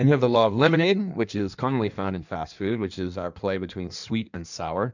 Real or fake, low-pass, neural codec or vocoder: fake; 7.2 kHz; codec, 16 kHz in and 24 kHz out, 1.1 kbps, FireRedTTS-2 codec